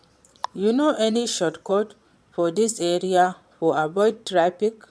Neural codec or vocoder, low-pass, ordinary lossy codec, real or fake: vocoder, 22.05 kHz, 80 mel bands, Vocos; none; none; fake